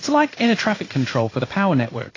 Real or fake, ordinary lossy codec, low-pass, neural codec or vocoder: fake; AAC, 32 kbps; 7.2 kHz; codec, 16 kHz in and 24 kHz out, 1 kbps, XY-Tokenizer